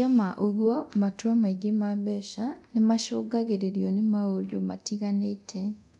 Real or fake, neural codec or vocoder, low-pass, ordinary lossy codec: fake; codec, 24 kHz, 0.9 kbps, DualCodec; 10.8 kHz; MP3, 96 kbps